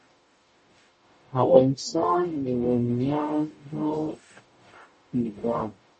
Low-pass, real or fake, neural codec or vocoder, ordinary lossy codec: 10.8 kHz; fake; codec, 44.1 kHz, 0.9 kbps, DAC; MP3, 32 kbps